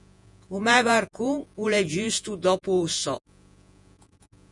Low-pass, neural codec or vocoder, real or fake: 10.8 kHz; vocoder, 48 kHz, 128 mel bands, Vocos; fake